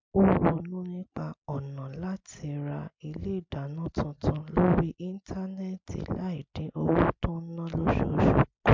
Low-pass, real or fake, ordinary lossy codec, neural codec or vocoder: 7.2 kHz; real; MP3, 64 kbps; none